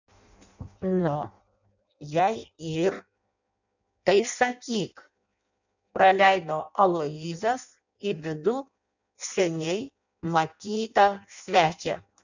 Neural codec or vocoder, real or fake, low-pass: codec, 16 kHz in and 24 kHz out, 0.6 kbps, FireRedTTS-2 codec; fake; 7.2 kHz